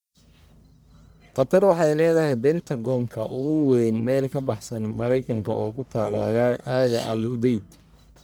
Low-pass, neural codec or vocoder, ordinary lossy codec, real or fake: none; codec, 44.1 kHz, 1.7 kbps, Pupu-Codec; none; fake